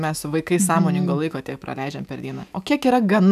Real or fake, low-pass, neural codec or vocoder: fake; 14.4 kHz; autoencoder, 48 kHz, 128 numbers a frame, DAC-VAE, trained on Japanese speech